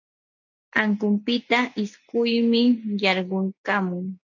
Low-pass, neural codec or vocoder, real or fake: 7.2 kHz; none; real